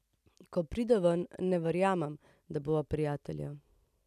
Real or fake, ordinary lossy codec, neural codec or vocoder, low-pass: real; none; none; 14.4 kHz